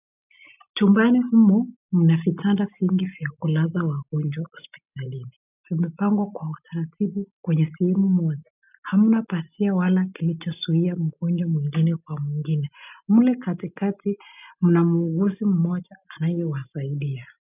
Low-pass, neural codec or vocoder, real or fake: 3.6 kHz; none; real